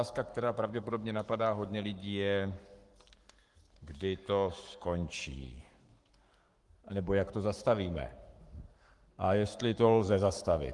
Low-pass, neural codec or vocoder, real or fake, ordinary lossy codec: 10.8 kHz; codec, 44.1 kHz, 7.8 kbps, Pupu-Codec; fake; Opus, 24 kbps